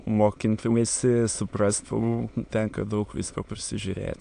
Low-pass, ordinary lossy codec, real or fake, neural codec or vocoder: 9.9 kHz; AAC, 64 kbps; fake; autoencoder, 22.05 kHz, a latent of 192 numbers a frame, VITS, trained on many speakers